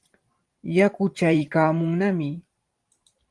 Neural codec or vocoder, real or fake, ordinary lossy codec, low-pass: vocoder, 44.1 kHz, 128 mel bands every 512 samples, BigVGAN v2; fake; Opus, 24 kbps; 10.8 kHz